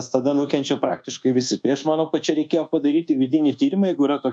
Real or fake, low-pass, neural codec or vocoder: fake; 9.9 kHz; codec, 24 kHz, 1.2 kbps, DualCodec